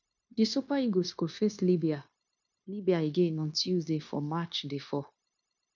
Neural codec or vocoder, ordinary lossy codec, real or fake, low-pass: codec, 16 kHz, 0.9 kbps, LongCat-Audio-Codec; none; fake; 7.2 kHz